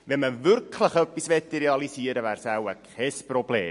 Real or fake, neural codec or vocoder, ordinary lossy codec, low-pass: real; none; MP3, 48 kbps; 10.8 kHz